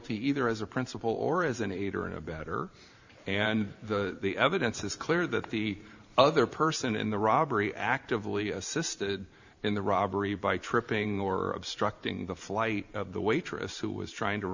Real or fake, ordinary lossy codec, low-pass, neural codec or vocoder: fake; Opus, 64 kbps; 7.2 kHz; vocoder, 44.1 kHz, 128 mel bands every 512 samples, BigVGAN v2